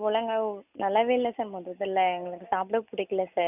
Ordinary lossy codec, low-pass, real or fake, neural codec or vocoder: none; 3.6 kHz; real; none